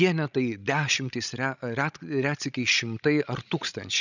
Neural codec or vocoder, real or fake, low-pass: codec, 16 kHz, 16 kbps, FreqCodec, larger model; fake; 7.2 kHz